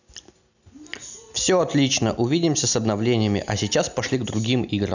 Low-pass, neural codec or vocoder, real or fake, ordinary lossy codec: 7.2 kHz; none; real; none